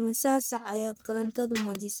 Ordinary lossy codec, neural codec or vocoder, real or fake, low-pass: none; codec, 44.1 kHz, 1.7 kbps, Pupu-Codec; fake; none